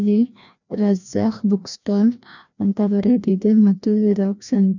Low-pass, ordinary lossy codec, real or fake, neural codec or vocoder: 7.2 kHz; none; fake; codec, 16 kHz, 1 kbps, FreqCodec, larger model